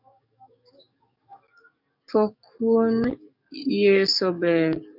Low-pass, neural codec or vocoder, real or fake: 5.4 kHz; codec, 44.1 kHz, 7.8 kbps, DAC; fake